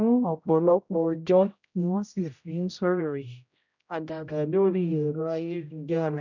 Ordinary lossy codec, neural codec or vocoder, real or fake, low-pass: none; codec, 16 kHz, 0.5 kbps, X-Codec, HuBERT features, trained on general audio; fake; 7.2 kHz